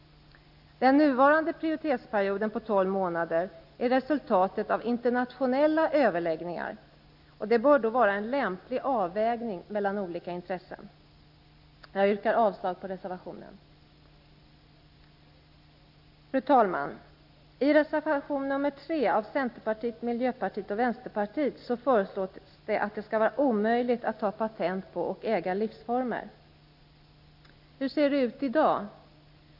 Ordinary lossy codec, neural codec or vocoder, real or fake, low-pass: AAC, 48 kbps; none; real; 5.4 kHz